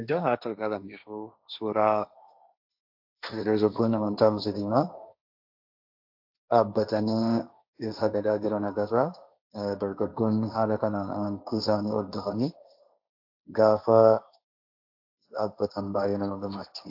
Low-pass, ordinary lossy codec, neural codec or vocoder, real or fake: 5.4 kHz; AAC, 48 kbps; codec, 16 kHz, 1.1 kbps, Voila-Tokenizer; fake